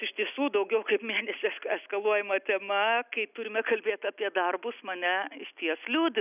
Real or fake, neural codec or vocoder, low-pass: real; none; 3.6 kHz